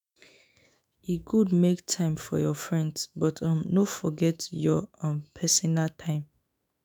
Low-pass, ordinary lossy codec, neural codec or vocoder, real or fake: none; none; autoencoder, 48 kHz, 128 numbers a frame, DAC-VAE, trained on Japanese speech; fake